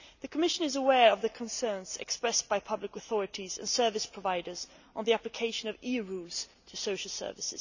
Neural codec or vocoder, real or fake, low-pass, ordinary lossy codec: none; real; 7.2 kHz; none